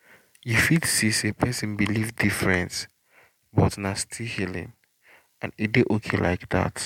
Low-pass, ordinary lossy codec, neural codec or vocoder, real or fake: 19.8 kHz; MP3, 96 kbps; none; real